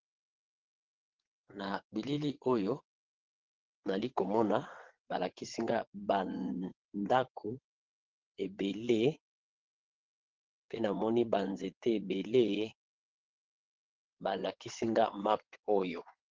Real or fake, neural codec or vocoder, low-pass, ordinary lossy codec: fake; vocoder, 22.05 kHz, 80 mel bands, WaveNeXt; 7.2 kHz; Opus, 16 kbps